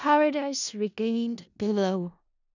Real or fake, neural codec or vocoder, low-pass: fake; codec, 16 kHz in and 24 kHz out, 0.4 kbps, LongCat-Audio-Codec, four codebook decoder; 7.2 kHz